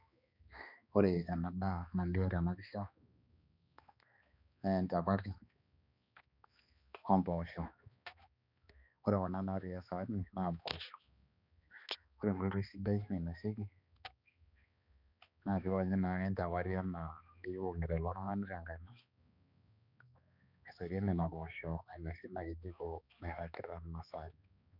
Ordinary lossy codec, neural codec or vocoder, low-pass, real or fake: none; codec, 16 kHz, 2 kbps, X-Codec, HuBERT features, trained on balanced general audio; 5.4 kHz; fake